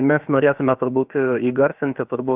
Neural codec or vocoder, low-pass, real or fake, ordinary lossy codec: codec, 16 kHz, about 1 kbps, DyCAST, with the encoder's durations; 3.6 kHz; fake; Opus, 32 kbps